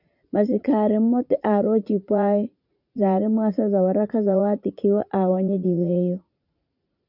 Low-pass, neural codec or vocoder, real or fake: 5.4 kHz; vocoder, 44.1 kHz, 80 mel bands, Vocos; fake